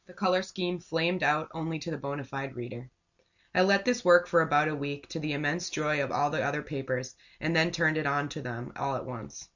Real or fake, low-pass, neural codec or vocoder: real; 7.2 kHz; none